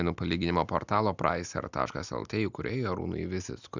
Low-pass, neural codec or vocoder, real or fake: 7.2 kHz; none; real